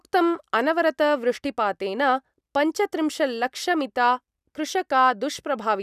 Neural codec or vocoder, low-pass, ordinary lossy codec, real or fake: none; 14.4 kHz; none; real